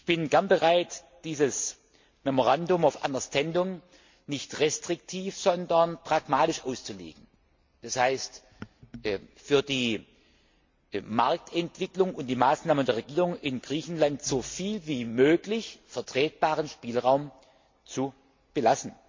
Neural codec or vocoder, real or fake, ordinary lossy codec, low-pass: none; real; MP3, 48 kbps; 7.2 kHz